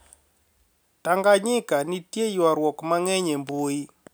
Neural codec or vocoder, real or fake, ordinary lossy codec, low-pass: none; real; none; none